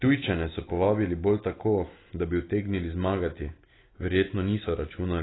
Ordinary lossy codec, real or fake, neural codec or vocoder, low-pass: AAC, 16 kbps; real; none; 7.2 kHz